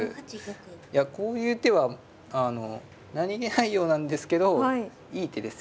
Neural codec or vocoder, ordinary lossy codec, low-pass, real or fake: none; none; none; real